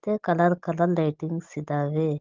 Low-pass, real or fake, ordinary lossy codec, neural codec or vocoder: 7.2 kHz; real; Opus, 16 kbps; none